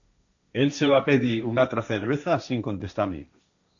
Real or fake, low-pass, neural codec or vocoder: fake; 7.2 kHz; codec, 16 kHz, 1.1 kbps, Voila-Tokenizer